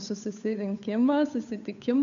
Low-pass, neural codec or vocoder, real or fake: 7.2 kHz; codec, 16 kHz, 8 kbps, FunCodec, trained on Chinese and English, 25 frames a second; fake